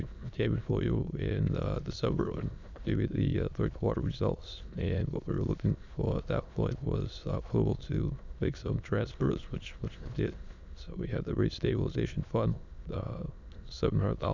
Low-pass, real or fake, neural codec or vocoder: 7.2 kHz; fake; autoencoder, 22.05 kHz, a latent of 192 numbers a frame, VITS, trained on many speakers